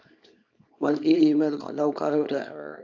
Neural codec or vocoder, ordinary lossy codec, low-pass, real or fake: codec, 24 kHz, 0.9 kbps, WavTokenizer, small release; AAC, 48 kbps; 7.2 kHz; fake